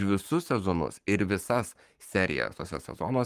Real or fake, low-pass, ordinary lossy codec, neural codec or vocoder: fake; 14.4 kHz; Opus, 32 kbps; codec, 44.1 kHz, 7.8 kbps, Pupu-Codec